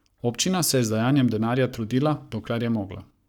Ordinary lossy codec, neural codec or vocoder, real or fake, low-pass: none; codec, 44.1 kHz, 7.8 kbps, Pupu-Codec; fake; 19.8 kHz